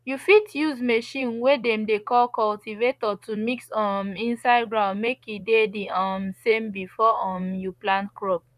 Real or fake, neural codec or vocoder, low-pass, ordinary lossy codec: fake; vocoder, 44.1 kHz, 128 mel bands, Pupu-Vocoder; 14.4 kHz; none